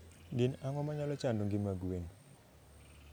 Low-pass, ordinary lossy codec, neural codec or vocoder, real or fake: none; none; none; real